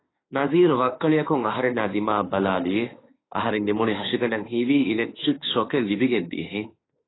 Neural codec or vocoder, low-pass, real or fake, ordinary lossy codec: autoencoder, 48 kHz, 32 numbers a frame, DAC-VAE, trained on Japanese speech; 7.2 kHz; fake; AAC, 16 kbps